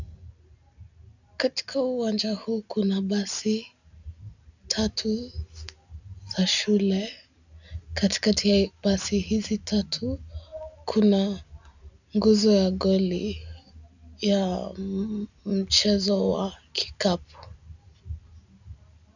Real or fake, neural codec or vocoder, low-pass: real; none; 7.2 kHz